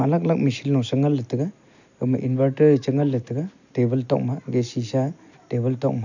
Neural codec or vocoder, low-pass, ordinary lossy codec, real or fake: none; 7.2 kHz; none; real